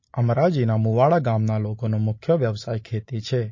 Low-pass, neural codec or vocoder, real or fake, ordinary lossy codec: 7.2 kHz; none; real; MP3, 32 kbps